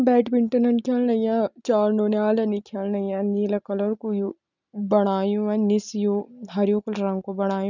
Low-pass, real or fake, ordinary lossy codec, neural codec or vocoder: 7.2 kHz; real; none; none